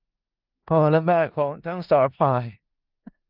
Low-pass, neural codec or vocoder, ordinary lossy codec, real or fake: 5.4 kHz; codec, 16 kHz in and 24 kHz out, 0.4 kbps, LongCat-Audio-Codec, four codebook decoder; Opus, 24 kbps; fake